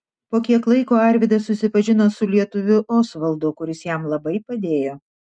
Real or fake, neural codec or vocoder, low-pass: real; none; 9.9 kHz